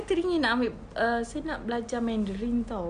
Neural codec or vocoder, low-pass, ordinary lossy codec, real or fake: none; 9.9 kHz; none; real